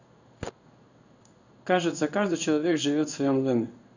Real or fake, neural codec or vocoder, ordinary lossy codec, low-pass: fake; codec, 16 kHz in and 24 kHz out, 1 kbps, XY-Tokenizer; none; 7.2 kHz